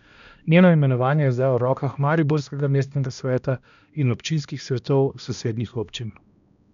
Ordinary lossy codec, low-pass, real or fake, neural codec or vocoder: none; 7.2 kHz; fake; codec, 16 kHz, 1 kbps, X-Codec, HuBERT features, trained on balanced general audio